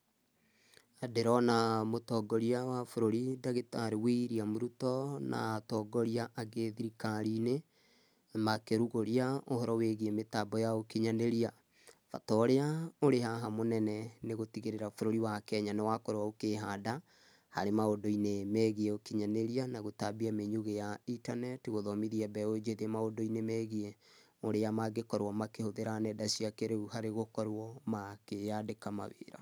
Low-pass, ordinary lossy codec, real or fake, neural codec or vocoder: none; none; real; none